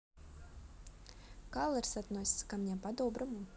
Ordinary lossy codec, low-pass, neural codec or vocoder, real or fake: none; none; none; real